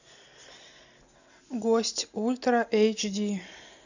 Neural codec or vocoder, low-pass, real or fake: none; 7.2 kHz; real